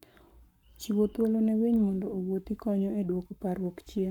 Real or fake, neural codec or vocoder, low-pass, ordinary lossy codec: fake; codec, 44.1 kHz, 7.8 kbps, Pupu-Codec; 19.8 kHz; none